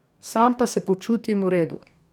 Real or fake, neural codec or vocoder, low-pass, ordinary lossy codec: fake; codec, 44.1 kHz, 2.6 kbps, DAC; 19.8 kHz; none